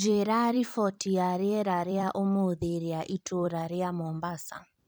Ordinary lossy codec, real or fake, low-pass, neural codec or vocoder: none; fake; none; vocoder, 44.1 kHz, 128 mel bands every 256 samples, BigVGAN v2